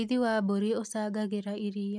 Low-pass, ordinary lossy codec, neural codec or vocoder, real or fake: none; none; none; real